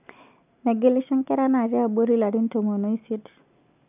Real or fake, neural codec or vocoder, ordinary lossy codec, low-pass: real; none; none; 3.6 kHz